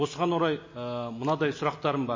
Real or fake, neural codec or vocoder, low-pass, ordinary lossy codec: real; none; 7.2 kHz; MP3, 32 kbps